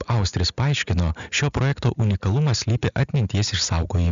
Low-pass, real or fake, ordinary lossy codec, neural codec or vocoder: 7.2 kHz; real; Opus, 64 kbps; none